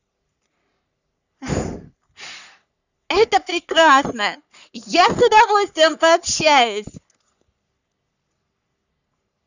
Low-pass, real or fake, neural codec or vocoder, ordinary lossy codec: 7.2 kHz; fake; codec, 44.1 kHz, 3.4 kbps, Pupu-Codec; none